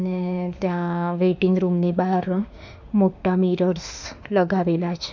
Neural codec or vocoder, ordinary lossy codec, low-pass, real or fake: autoencoder, 48 kHz, 32 numbers a frame, DAC-VAE, trained on Japanese speech; none; 7.2 kHz; fake